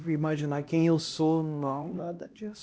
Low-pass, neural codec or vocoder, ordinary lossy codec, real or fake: none; codec, 16 kHz, 1 kbps, X-Codec, HuBERT features, trained on LibriSpeech; none; fake